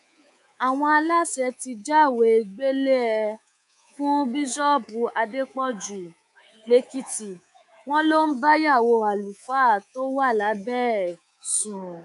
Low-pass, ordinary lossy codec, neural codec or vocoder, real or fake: 10.8 kHz; none; codec, 24 kHz, 3.1 kbps, DualCodec; fake